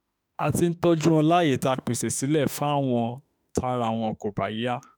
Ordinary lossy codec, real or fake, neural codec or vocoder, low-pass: none; fake; autoencoder, 48 kHz, 32 numbers a frame, DAC-VAE, trained on Japanese speech; none